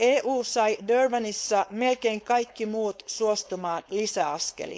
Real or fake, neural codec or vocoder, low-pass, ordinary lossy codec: fake; codec, 16 kHz, 4.8 kbps, FACodec; none; none